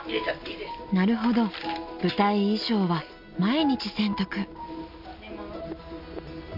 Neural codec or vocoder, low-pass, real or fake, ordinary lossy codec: none; 5.4 kHz; real; none